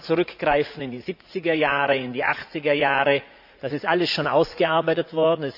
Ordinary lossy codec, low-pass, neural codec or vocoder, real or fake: AAC, 48 kbps; 5.4 kHz; vocoder, 44.1 kHz, 80 mel bands, Vocos; fake